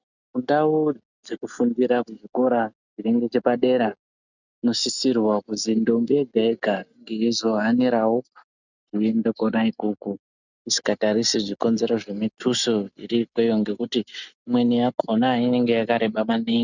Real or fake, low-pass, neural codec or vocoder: real; 7.2 kHz; none